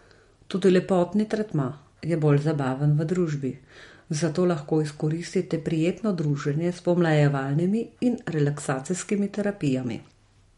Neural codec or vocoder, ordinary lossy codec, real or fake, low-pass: none; MP3, 48 kbps; real; 14.4 kHz